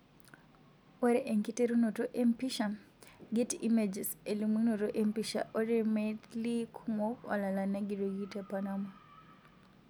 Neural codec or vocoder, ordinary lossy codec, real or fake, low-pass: none; none; real; none